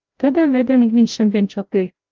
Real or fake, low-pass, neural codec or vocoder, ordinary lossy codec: fake; 7.2 kHz; codec, 16 kHz, 0.5 kbps, FreqCodec, larger model; Opus, 32 kbps